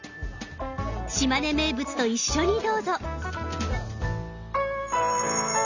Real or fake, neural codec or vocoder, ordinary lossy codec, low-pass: real; none; none; 7.2 kHz